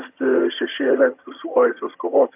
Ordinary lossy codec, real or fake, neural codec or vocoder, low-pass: AAC, 32 kbps; fake; vocoder, 22.05 kHz, 80 mel bands, HiFi-GAN; 3.6 kHz